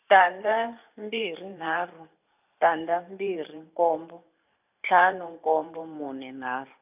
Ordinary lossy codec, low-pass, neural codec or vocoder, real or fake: none; 3.6 kHz; vocoder, 44.1 kHz, 128 mel bands every 512 samples, BigVGAN v2; fake